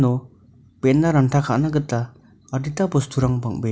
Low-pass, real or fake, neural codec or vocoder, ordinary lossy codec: none; real; none; none